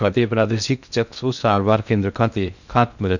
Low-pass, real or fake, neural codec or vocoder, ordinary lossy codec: 7.2 kHz; fake; codec, 16 kHz in and 24 kHz out, 0.6 kbps, FocalCodec, streaming, 2048 codes; none